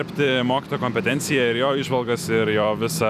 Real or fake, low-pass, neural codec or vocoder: fake; 14.4 kHz; vocoder, 44.1 kHz, 128 mel bands every 256 samples, BigVGAN v2